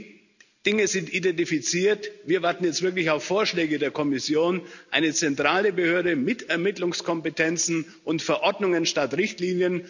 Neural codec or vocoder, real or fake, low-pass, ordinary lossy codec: none; real; 7.2 kHz; none